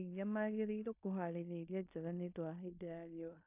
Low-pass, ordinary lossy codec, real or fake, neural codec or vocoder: 3.6 kHz; AAC, 32 kbps; fake; codec, 16 kHz in and 24 kHz out, 0.9 kbps, LongCat-Audio-Codec, fine tuned four codebook decoder